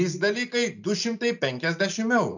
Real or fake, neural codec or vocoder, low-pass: real; none; 7.2 kHz